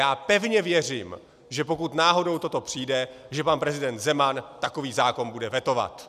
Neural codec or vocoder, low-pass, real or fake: none; 14.4 kHz; real